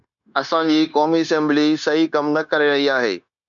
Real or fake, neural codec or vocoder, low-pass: fake; codec, 16 kHz, 0.9 kbps, LongCat-Audio-Codec; 7.2 kHz